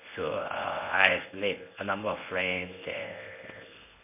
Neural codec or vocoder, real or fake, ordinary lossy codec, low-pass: codec, 24 kHz, 0.9 kbps, WavTokenizer, medium speech release version 1; fake; MP3, 24 kbps; 3.6 kHz